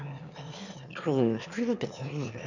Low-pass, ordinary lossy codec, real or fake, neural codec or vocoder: 7.2 kHz; none; fake; autoencoder, 22.05 kHz, a latent of 192 numbers a frame, VITS, trained on one speaker